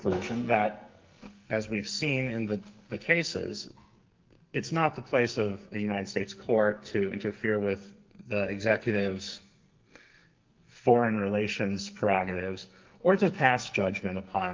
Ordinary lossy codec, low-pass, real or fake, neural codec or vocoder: Opus, 24 kbps; 7.2 kHz; fake; codec, 44.1 kHz, 2.6 kbps, SNAC